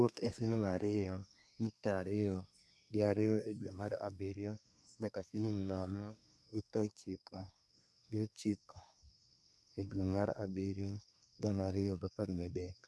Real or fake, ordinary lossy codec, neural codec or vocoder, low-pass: fake; none; codec, 24 kHz, 1 kbps, SNAC; none